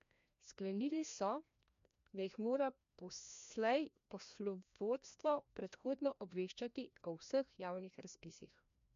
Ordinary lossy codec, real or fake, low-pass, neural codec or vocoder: MP3, 48 kbps; fake; 7.2 kHz; codec, 16 kHz, 1 kbps, FreqCodec, larger model